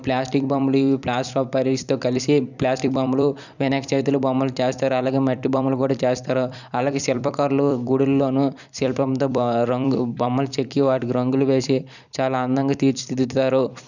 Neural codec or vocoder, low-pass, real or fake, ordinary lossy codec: vocoder, 44.1 kHz, 128 mel bands every 256 samples, BigVGAN v2; 7.2 kHz; fake; none